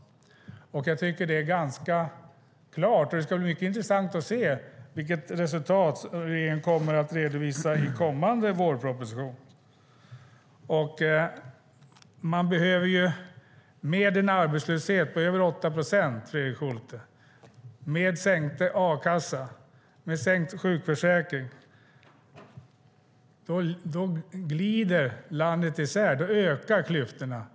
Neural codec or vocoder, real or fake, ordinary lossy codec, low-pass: none; real; none; none